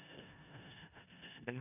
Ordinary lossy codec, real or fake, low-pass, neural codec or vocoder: none; fake; 3.6 kHz; codec, 16 kHz in and 24 kHz out, 0.4 kbps, LongCat-Audio-Codec, four codebook decoder